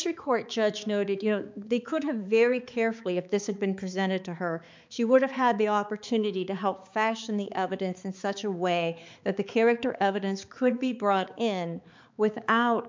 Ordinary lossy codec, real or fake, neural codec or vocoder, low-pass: MP3, 64 kbps; fake; codec, 16 kHz, 4 kbps, X-Codec, HuBERT features, trained on balanced general audio; 7.2 kHz